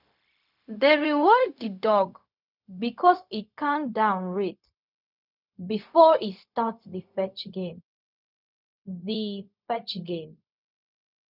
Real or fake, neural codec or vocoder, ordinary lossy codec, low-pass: fake; codec, 16 kHz, 0.4 kbps, LongCat-Audio-Codec; none; 5.4 kHz